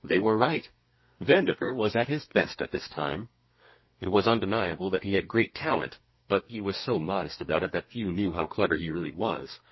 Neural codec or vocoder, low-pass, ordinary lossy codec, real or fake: codec, 32 kHz, 1.9 kbps, SNAC; 7.2 kHz; MP3, 24 kbps; fake